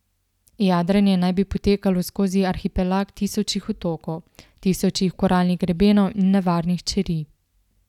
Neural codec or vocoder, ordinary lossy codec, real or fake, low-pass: none; none; real; 19.8 kHz